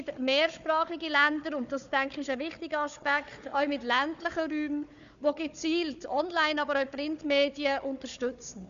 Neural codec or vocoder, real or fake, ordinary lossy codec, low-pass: codec, 16 kHz, 4 kbps, FunCodec, trained on Chinese and English, 50 frames a second; fake; none; 7.2 kHz